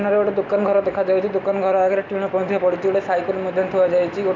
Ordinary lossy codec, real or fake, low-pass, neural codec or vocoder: MP3, 64 kbps; real; 7.2 kHz; none